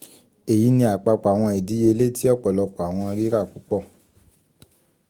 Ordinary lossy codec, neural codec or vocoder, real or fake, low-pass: Opus, 24 kbps; autoencoder, 48 kHz, 128 numbers a frame, DAC-VAE, trained on Japanese speech; fake; 19.8 kHz